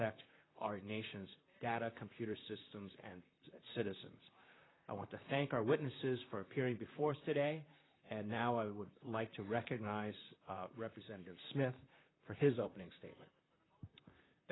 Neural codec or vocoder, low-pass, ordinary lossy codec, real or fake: vocoder, 44.1 kHz, 128 mel bands, Pupu-Vocoder; 7.2 kHz; AAC, 16 kbps; fake